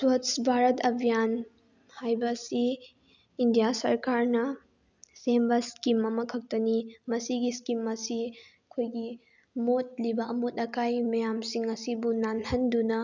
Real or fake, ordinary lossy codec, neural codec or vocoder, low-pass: real; none; none; 7.2 kHz